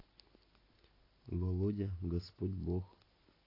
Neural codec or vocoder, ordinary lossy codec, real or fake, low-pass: none; AAC, 24 kbps; real; 5.4 kHz